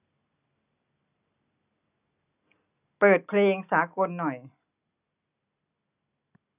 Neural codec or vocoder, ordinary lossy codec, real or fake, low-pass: none; none; real; 3.6 kHz